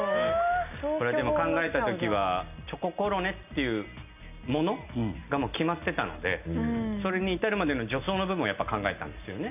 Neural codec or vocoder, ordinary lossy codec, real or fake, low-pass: none; none; real; 3.6 kHz